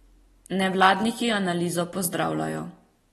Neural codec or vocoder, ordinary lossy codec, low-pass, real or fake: none; AAC, 32 kbps; 19.8 kHz; real